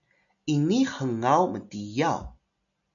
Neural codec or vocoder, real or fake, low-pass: none; real; 7.2 kHz